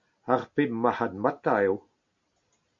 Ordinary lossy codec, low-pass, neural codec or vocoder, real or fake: MP3, 48 kbps; 7.2 kHz; none; real